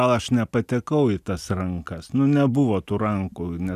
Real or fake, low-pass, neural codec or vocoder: real; 14.4 kHz; none